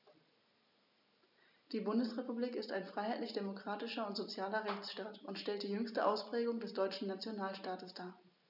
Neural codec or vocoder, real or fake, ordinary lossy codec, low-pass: none; real; none; 5.4 kHz